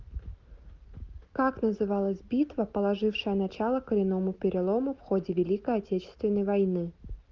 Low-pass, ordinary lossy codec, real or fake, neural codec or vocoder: 7.2 kHz; Opus, 24 kbps; real; none